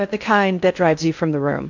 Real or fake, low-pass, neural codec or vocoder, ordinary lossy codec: fake; 7.2 kHz; codec, 16 kHz in and 24 kHz out, 0.6 kbps, FocalCodec, streaming, 2048 codes; AAC, 48 kbps